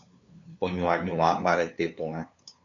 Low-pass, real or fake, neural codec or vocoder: 7.2 kHz; fake; codec, 16 kHz, 2 kbps, FunCodec, trained on LibriTTS, 25 frames a second